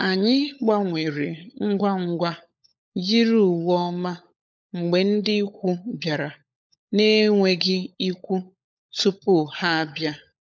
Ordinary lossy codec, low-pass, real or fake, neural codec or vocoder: none; none; fake; codec, 16 kHz, 16 kbps, FunCodec, trained on LibriTTS, 50 frames a second